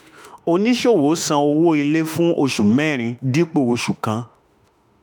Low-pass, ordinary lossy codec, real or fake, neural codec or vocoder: none; none; fake; autoencoder, 48 kHz, 32 numbers a frame, DAC-VAE, trained on Japanese speech